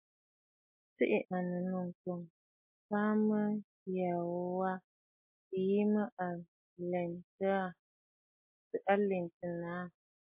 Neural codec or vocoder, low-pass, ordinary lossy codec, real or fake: none; 3.6 kHz; MP3, 32 kbps; real